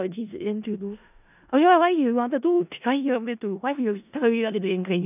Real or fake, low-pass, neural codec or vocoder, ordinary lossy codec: fake; 3.6 kHz; codec, 16 kHz in and 24 kHz out, 0.4 kbps, LongCat-Audio-Codec, four codebook decoder; none